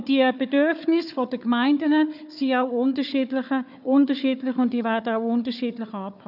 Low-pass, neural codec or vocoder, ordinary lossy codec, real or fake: 5.4 kHz; codec, 16 kHz, 4 kbps, FunCodec, trained on Chinese and English, 50 frames a second; none; fake